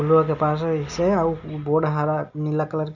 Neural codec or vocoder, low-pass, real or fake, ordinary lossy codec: none; 7.2 kHz; real; none